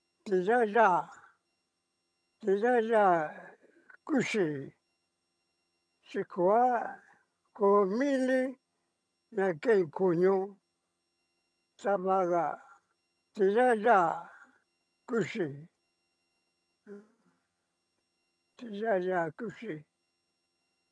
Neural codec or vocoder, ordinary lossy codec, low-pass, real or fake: vocoder, 22.05 kHz, 80 mel bands, HiFi-GAN; none; none; fake